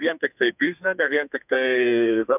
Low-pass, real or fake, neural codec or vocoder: 3.6 kHz; fake; codec, 32 kHz, 1.9 kbps, SNAC